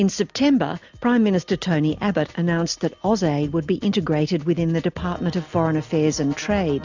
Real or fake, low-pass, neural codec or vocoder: real; 7.2 kHz; none